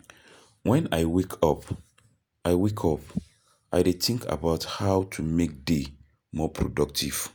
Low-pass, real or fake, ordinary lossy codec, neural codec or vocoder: none; real; none; none